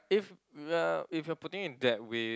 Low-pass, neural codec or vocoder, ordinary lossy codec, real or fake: none; none; none; real